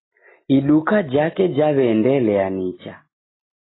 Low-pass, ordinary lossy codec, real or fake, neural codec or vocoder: 7.2 kHz; AAC, 16 kbps; real; none